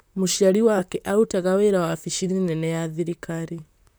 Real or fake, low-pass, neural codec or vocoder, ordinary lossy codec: fake; none; vocoder, 44.1 kHz, 128 mel bands, Pupu-Vocoder; none